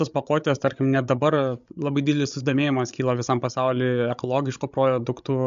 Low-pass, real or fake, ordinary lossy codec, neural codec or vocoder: 7.2 kHz; fake; MP3, 64 kbps; codec, 16 kHz, 16 kbps, FreqCodec, larger model